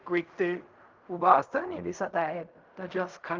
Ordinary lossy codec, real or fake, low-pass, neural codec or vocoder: Opus, 24 kbps; fake; 7.2 kHz; codec, 16 kHz in and 24 kHz out, 0.4 kbps, LongCat-Audio-Codec, fine tuned four codebook decoder